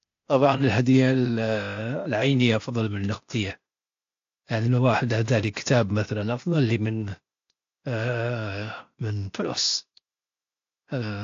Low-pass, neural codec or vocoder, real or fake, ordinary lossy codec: 7.2 kHz; codec, 16 kHz, 0.8 kbps, ZipCodec; fake; AAC, 48 kbps